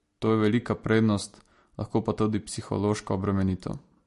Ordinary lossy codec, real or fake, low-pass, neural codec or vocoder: MP3, 48 kbps; real; 14.4 kHz; none